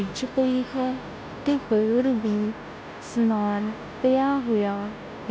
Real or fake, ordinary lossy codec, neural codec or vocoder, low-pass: fake; none; codec, 16 kHz, 0.5 kbps, FunCodec, trained on Chinese and English, 25 frames a second; none